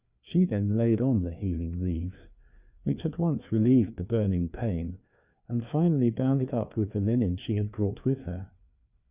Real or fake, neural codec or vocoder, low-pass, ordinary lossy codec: fake; codec, 16 kHz, 2 kbps, FreqCodec, larger model; 3.6 kHz; Opus, 64 kbps